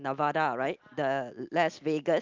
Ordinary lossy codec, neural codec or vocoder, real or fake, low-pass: Opus, 24 kbps; none; real; 7.2 kHz